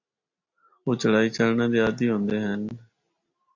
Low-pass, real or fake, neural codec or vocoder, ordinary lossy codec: 7.2 kHz; real; none; AAC, 48 kbps